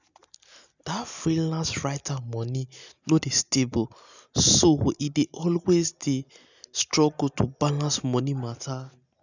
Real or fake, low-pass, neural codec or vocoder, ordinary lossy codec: real; 7.2 kHz; none; none